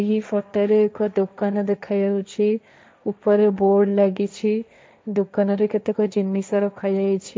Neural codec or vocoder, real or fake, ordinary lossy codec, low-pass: codec, 16 kHz, 1.1 kbps, Voila-Tokenizer; fake; none; none